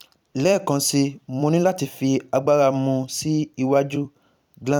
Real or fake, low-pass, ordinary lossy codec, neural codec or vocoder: fake; 19.8 kHz; none; vocoder, 44.1 kHz, 128 mel bands every 256 samples, BigVGAN v2